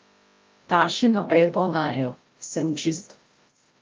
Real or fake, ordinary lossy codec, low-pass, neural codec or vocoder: fake; Opus, 24 kbps; 7.2 kHz; codec, 16 kHz, 0.5 kbps, FreqCodec, larger model